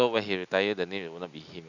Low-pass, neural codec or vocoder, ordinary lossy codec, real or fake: 7.2 kHz; none; none; real